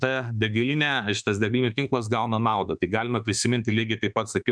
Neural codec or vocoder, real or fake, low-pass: autoencoder, 48 kHz, 32 numbers a frame, DAC-VAE, trained on Japanese speech; fake; 9.9 kHz